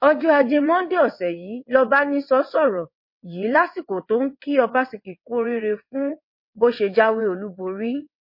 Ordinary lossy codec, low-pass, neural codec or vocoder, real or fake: MP3, 32 kbps; 5.4 kHz; vocoder, 22.05 kHz, 80 mel bands, WaveNeXt; fake